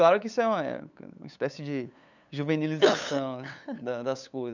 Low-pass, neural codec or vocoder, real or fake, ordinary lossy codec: 7.2 kHz; codec, 16 kHz, 8 kbps, FunCodec, trained on LibriTTS, 25 frames a second; fake; none